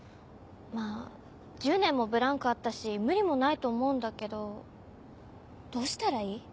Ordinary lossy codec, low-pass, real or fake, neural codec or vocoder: none; none; real; none